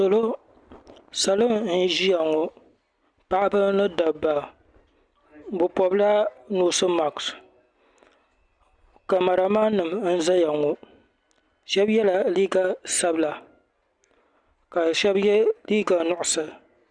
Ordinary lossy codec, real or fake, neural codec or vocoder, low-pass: Opus, 64 kbps; real; none; 9.9 kHz